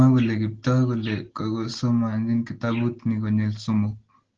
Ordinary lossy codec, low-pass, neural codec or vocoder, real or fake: Opus, 16 kbps; 7.2 kHz; none; real